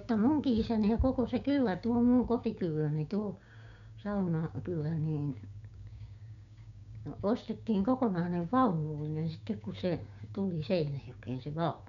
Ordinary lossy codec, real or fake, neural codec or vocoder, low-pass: none; fake; codec, 16 kHz, 6 kbps, DAC; 7.2 kHz